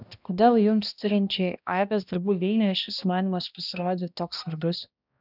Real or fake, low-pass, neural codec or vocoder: fake; 5.4 kHz; codec, 16 kHz, 1 kbps, X-Codec, HuBERT features, trained on balanced general audio